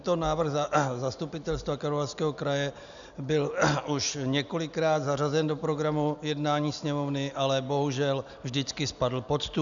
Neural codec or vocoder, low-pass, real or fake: none; 7.2 kHz; real